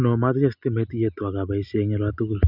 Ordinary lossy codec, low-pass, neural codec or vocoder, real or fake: none; 5.4 kHz; none; real